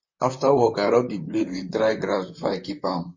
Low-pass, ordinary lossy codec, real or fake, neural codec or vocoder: 7.2 kHz; MP3, 32 kbps; fake; vocoder, 44.1 kHz, 128 mel bands, Pupu-Vocoder